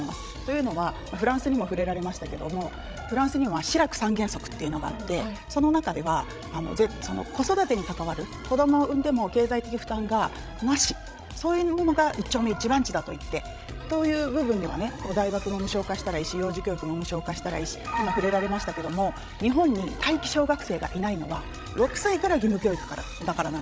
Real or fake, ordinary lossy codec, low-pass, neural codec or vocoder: fake; none; none; codec, 16 kHz, 16 kbps, FreqCodec, larger model